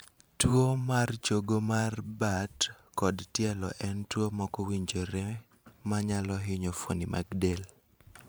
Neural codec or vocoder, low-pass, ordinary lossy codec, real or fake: vocoder, 44.1 kHz, 128 mel bands every 512 samples, BigVGAN v2; none; none; fake